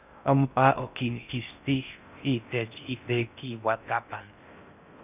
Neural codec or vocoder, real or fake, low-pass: codec, 16 kHz in and 24 kHz out, 0.6 kbps, FocalCodec, streaming, 4096 codes; fake; 3.6 kHz